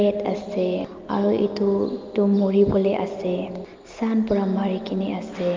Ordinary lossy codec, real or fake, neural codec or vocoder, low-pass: Opus, 24 kbps; real; none; 7.2 kHz